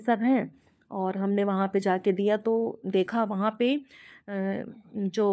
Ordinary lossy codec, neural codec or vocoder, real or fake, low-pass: none; codec, 16 kHz, 4 kbps, FunCodec, trained on LibriTTS, 50 frames a second; fake; none